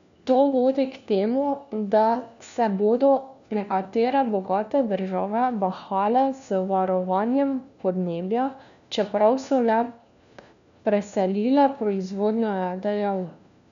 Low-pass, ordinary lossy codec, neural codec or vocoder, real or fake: 7.2 kHz; none; codec, 16 kHz, 1 kbps, FunCodec, trained on LibriTTS, 50 frames a second; fake